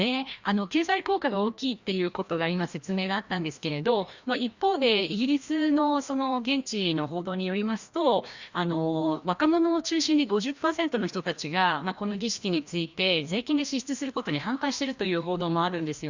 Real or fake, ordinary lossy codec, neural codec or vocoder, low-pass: fake; Opus, 64 kbps; codec, 16 kHz, 1 kbps, FreqCodec, larger model; 7.2 kHz